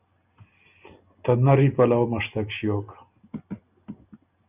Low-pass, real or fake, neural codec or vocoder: 3.6 kHz; real; none